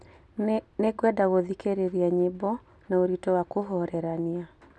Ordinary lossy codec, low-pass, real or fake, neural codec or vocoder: none; none; real; none